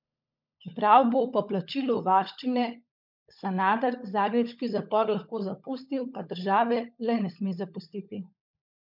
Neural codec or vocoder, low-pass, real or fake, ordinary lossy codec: codec, 16 kHz, 16 kbps, FunCodec, trained on LibriTTS, 50 frames a second; 5.4 kHz; fake; MP3, 48 kbps